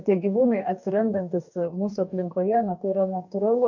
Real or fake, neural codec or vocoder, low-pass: fake; codec, 44.1 kHz, 2.6 kbps, DAC; 7.2 kHz